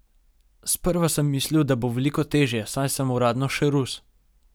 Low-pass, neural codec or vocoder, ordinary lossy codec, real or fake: none; none; none; real